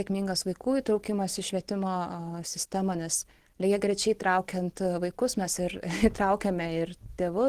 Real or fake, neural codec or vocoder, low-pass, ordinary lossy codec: real; none; 14.4 kHz; Opus, 16 kbps